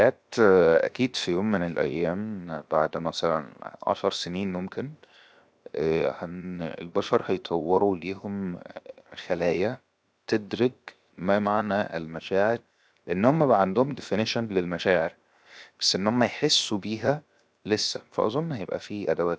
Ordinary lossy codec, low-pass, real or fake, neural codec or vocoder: none; none; fake; codec, 16 kHz, 0.7 kbps, FocalCodec